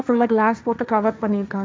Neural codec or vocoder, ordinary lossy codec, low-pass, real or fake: codec, 16 kHz, 1.1 kbps, Voila-Tokenizer; none; none; fake